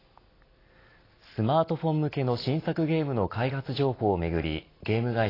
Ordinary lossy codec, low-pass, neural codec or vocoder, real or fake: AAC, 24 kbps; 5.4 kHz; none; real